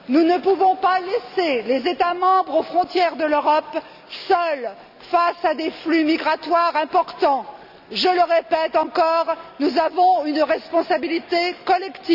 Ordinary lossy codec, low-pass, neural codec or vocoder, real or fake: none; 5.4 kHz; none; real